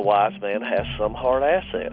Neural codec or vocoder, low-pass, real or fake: none; 5.4 kHz; real